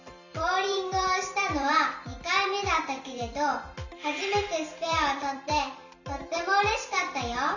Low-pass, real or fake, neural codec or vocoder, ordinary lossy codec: 7.2 kHz; fake; vocoder, 44.1 kHz, 128 mel bands every 256 samples, BigVGAN v2; none